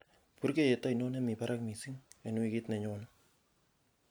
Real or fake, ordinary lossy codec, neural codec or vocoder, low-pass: real; none; none; none